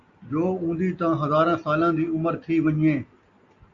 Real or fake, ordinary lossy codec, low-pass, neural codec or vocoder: real; Opus, 64 kbps; 7.2 kHz; none